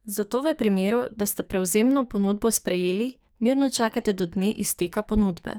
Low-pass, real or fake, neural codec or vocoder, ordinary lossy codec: none; fake; codec, 44.1 kHz, 2.6 kbps, SNAC; none